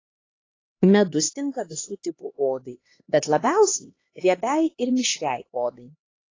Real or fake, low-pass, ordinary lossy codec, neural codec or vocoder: fake; 7.2 kHz; AAC, 32 kbps; codec, 16 kHz, 2 kbps, X-Codec, HuBERT features, trained on LibriSpeech